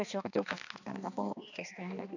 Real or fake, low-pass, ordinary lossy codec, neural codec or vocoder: fake; 7.2 kHz; none; codec, 16 kHz, 2 kbps, X-Codec, HuBERT features, trained on balanced general audio